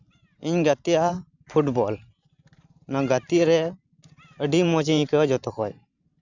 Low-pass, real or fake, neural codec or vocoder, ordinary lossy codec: 7.2 kHz; fake; vocoder, 44.1 kHz, 128 mel bands every 512 samples, BigVGAN v2; none